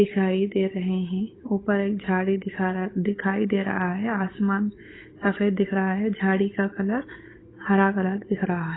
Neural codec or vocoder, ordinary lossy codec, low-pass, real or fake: codec, 16 kHz, 8 kbps, FunCodec, trained on Chinese and English, 25 frames a second; AAC, 16 kbps; 7.2 kHz; fake